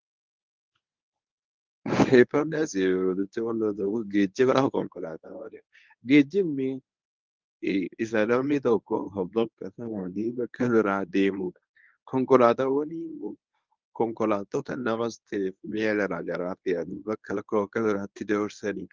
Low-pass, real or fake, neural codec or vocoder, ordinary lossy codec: 7.2 kHz; fake; codec, 24 kHz, 0.9 kbps, WavTokenizer, medium speech release version 1; Opus, 24 kbps